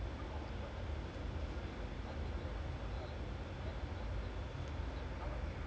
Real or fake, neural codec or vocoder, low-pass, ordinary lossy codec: real; none; none; none